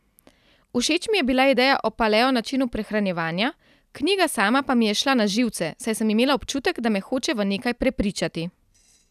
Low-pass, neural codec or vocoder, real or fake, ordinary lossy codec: 14.4 kHz; none; real; none